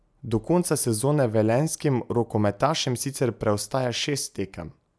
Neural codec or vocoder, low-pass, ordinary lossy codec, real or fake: none; none; none; real